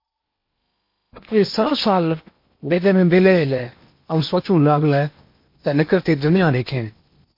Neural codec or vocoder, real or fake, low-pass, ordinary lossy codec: codec, 16 kHz in and 24 kHz out, 0.8 kbps, FocalCodec, streaming, 65536 codes; fake; 5.4 kHz; MP3, 32 kbps